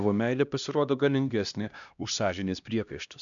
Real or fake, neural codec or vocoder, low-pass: fake; codec, 16 kHz, 1 kbps, X-Codec, HuBERT features, trained on LibriSpeech; 7.2 kHz